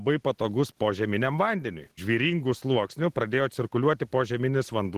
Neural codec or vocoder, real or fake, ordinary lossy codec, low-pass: none; real; Opus, 16 kbps; 14.4 kHz